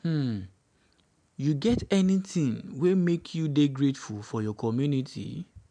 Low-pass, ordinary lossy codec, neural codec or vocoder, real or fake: 9.9 kHz; none; none; real